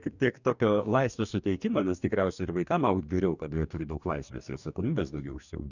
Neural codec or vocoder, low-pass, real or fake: codec, 44.1 kHz, 2.6 kbps, DAC; 7.2 kHz; fake